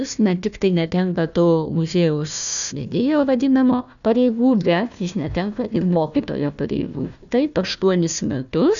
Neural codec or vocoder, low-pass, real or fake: codec, 16 kHz, 1 kbps, FunCodec, trained on Chinese and English, 50 frames a second; 7.2 kHz; fake